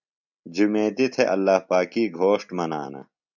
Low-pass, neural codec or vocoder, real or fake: 7.2 kHz; none; real